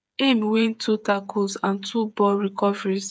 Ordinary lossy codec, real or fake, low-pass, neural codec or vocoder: none; fake; none; codec, 16 kHz, 8 kbps, FreqCodec, smaller model